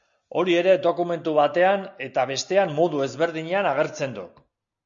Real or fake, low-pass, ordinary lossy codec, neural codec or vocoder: real; 7.2 kHz; MP3, 64 kbps; none